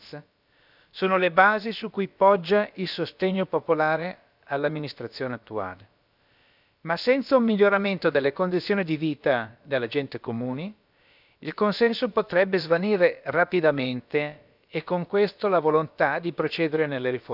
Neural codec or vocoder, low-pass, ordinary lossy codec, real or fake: codec, 16 kHz, about 1 kbps, DyCAST, with the encoder's durations; 5.4 kHz; none; fake